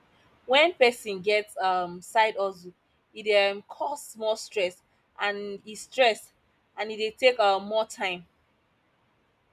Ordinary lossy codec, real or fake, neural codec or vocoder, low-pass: none; real; none; 14.4 kHz